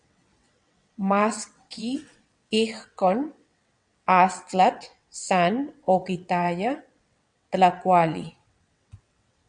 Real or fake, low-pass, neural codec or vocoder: fake; 9.9 kHz; vocoder, 22.05 kHz, 80 mel bands, WaveNeXt